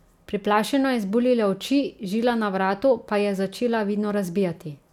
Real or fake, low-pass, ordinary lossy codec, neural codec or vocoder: real; 19.8 kHz; none; none